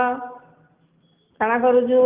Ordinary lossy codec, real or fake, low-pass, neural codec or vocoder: Opus, 24 kbps; real; 3.6 kHz; none